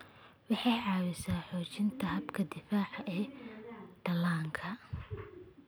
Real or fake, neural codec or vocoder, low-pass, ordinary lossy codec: real; none; none; none